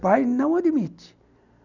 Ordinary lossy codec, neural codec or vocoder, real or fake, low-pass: none; none; real; 7.2 kHz